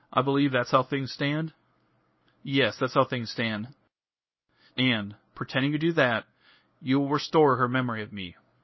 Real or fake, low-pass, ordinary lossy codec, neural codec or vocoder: fake; 7.2 kHz; MP3, 24 kbps; codec, 24 kHz, 0.9 kbps, WavTokenizer, medium speech release version 1